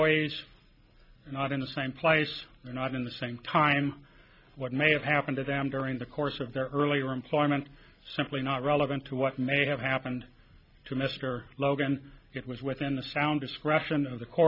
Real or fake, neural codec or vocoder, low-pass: real; none; 5.4 kHz